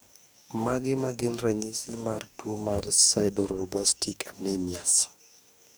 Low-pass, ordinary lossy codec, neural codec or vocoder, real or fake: none; none; codec, 44.1 kHz, 2.6 kbps, DAC; fake